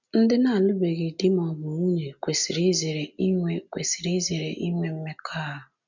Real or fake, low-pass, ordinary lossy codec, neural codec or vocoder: real; 7.2 kHz; none; none